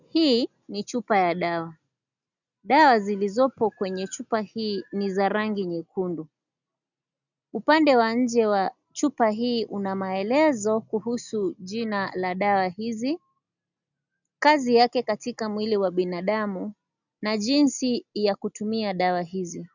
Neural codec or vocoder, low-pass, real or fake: none; 7.2 kHz; real